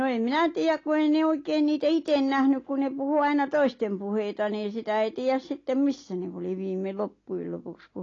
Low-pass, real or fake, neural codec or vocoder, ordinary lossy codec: 7.2 kHz; real; none; AAC, 32 kbps